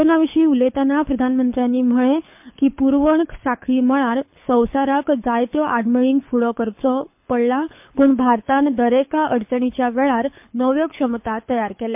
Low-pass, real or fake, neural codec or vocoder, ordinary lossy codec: 3.6 kHz; fake; codec, 24 kHz, 6 kbps, HILCodec; MP3, 32 kbps